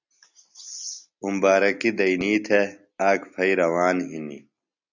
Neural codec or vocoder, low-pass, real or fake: none; 7.2 kHz; real